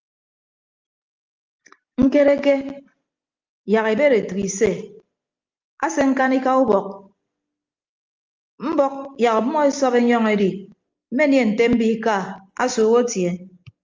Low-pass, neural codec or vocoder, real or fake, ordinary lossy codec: 7.2 kHz; none; real; Opus, 24 kbps